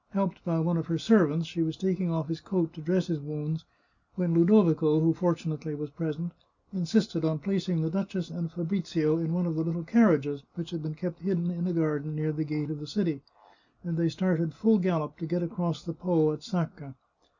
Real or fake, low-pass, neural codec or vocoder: real; 7.2 kHz; none